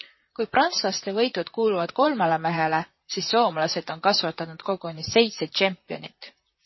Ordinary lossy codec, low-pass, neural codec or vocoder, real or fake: MP3, 24 kbps; 7.2 kHz; vocoder, 44.1 kHz, 128 mel bands, Pupu-Vocoder; fake